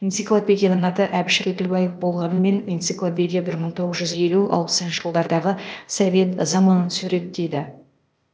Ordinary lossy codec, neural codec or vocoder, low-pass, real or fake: none; codec, 16 kHz, 0.8 kbps, ZipCodec; none; fake